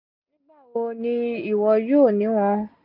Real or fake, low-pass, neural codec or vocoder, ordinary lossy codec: real; 5.4 kHz; none; none